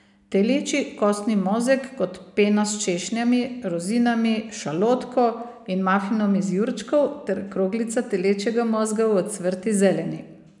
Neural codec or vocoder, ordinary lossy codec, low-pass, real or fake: none; none; 10.8 kHz; real